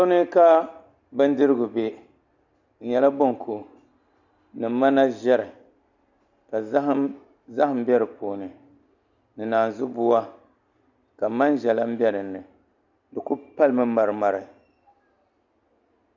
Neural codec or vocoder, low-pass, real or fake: none; 7.2 kHz; real